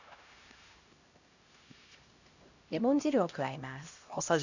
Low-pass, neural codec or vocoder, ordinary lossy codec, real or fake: 7.2 kHz; codec, 16 kHz, 1 kbps, X-Codec, HuBERT features, trained on LibriSpeech; none; fake